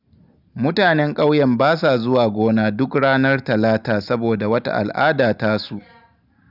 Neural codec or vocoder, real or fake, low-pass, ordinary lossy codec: none; real; 5.4 kHz; none